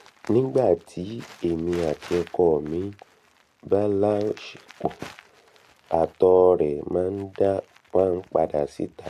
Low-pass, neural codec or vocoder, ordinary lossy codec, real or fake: 14.4 kHz; none; AAC, 64 kbps; real